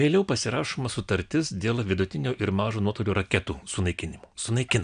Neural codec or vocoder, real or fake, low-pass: none; real; 9.9 kHz